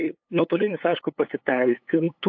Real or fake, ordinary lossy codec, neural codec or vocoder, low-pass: fake; AAC, 48 kbps; codec, 16 kHz, 8 kbps, FunCodec, trained on LibriTTS, 25 frames a second; 7.2 kHz